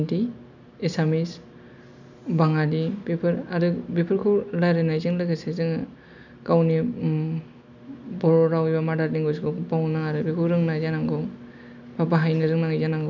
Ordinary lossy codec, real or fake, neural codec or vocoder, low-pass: none; real; none; 7.2 kHz